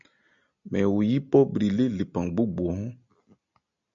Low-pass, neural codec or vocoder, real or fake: 7.2 kHz; none; real